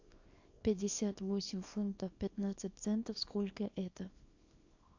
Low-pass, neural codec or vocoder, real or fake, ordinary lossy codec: 7.2 kHz; codec, 24 kHz, 0.9 kbps, WavTokenizer, small release; fake; AAC, 48 kbps